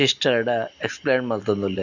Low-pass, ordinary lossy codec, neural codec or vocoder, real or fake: 7.2 kHz; none; none; real